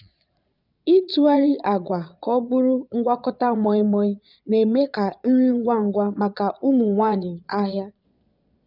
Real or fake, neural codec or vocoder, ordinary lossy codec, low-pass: fake; vocoder, 22.05 kHz, 80 mel bands, Vocos; none; 5.4 kHz